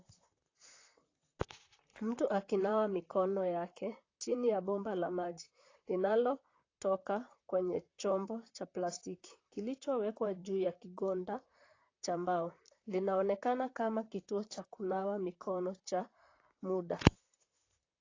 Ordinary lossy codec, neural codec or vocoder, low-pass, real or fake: AAC, 32 kbps; vocoder, 44.1 kHz, 128 mel bands, Pupu-Vocoder; 7.2 kHz; fake